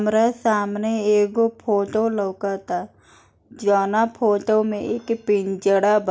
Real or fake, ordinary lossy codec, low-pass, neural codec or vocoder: real; none; none; none